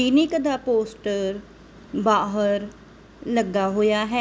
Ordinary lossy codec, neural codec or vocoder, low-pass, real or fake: none; none; none; real